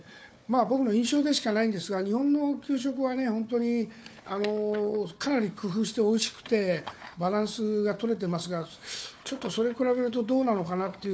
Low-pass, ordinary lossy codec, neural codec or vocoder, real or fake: none; none; codec, 16 kHz, 4 kbps, FunCodec, trained on Chinese and English, 50 frames a second; fake